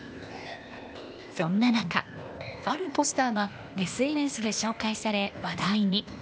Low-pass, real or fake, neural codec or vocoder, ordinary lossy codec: none; fake; codec, 16 kHz, 0.8 kbps, ZipCodec; none